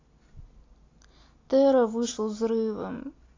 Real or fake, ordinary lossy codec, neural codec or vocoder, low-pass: real; AAC, 32 kbps; none; 7.2 kHz